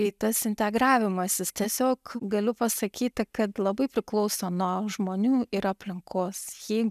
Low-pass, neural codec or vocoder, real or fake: 14.4 kHz; none; real